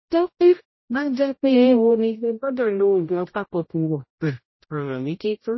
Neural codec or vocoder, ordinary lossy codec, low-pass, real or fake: codec, 16 kHz, 0.5 kbps, X-Codec, HuBERT features, trained on general audio; MP3, 24 kbps; 7.2 kHz; fake